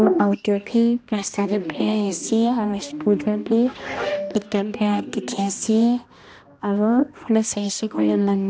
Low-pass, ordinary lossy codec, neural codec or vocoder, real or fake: none; none; codec, 16 kHz, 1 kbps, X-Codec, HuBERT features, trained on balanced general audio; fake